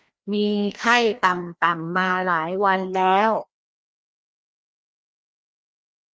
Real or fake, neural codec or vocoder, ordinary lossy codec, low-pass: fake; codec, 16 kHz, 1 kbps, FreqCodec, larger model; none; none